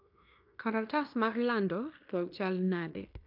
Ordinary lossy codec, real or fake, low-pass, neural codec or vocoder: AAC, 48 kbps; fake; 5.4 kHz; codec, 16 kHz in and 24 kHz out, 0.9 kbps, LongCat-Audio-Codec, fine tuned four codebook decoder